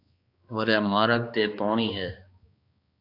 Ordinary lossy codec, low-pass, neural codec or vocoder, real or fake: AAC, 32 kbps; 5.4 kHz; codec, 16 kHz, 2 kbps, X-Codec, HuBERT features, trained on balanced general audio; fake